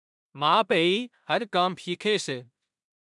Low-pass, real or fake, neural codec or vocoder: 10.8 kHz; fake; codec, 16 kHz in and 24 kHz out, 0.4 kbps, LongCat-Audio-Codec, two codebook decoder